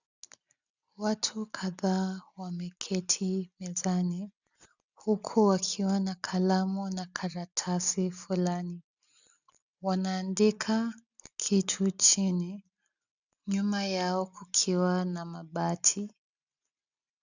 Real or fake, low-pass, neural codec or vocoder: real; 7.2 kHz; none